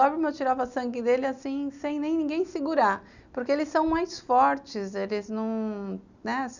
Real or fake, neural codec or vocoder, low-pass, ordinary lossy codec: real; none; 7.2 kHz; none